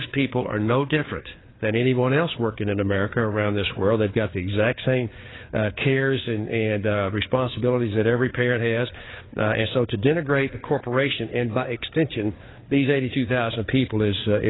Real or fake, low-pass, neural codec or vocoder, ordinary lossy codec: fake; 7.2 kHz; codec, 16 kHz, 4 kbps, FreqCodec, larger model; AAC, 16 kbps